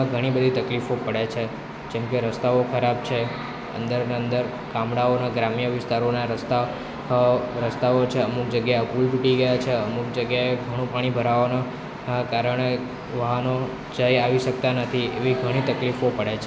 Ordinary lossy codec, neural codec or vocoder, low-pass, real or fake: none; none; none; real